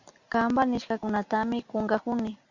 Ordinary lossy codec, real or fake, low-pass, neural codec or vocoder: Opus, 64 kbps; real; 7.2 kHz; none